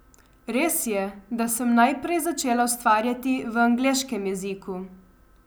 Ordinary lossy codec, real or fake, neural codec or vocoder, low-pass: none; real; none; none